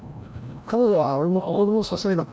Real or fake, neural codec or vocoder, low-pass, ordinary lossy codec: fake; codec, 16 kHz, 0.5 kbps, FreqCodec, larger model; none; none